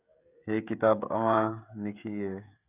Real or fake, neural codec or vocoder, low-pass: fake; codec, 16 kHz, 16 kbps, FreqCodec, smaller model; 3.6 kHz